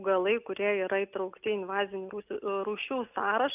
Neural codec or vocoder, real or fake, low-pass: none; real; 3.6 kHz